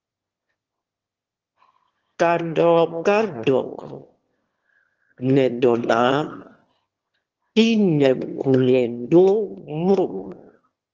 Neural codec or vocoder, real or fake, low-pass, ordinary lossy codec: autoencoder, 22.05 kHz, a latent of 192 numbers a frame, VITS, trained on one speaker; fake; 7.2 kHz; Opus, 16 kbps